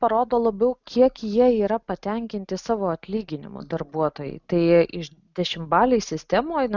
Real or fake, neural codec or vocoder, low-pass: real; none; 7.2 kHz